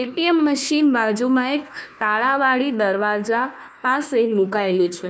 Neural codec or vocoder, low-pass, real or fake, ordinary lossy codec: codec, 16 kHz, 1 kbps, FunCodec, trained on Chinese and English, 50 frames a second; none; fake; none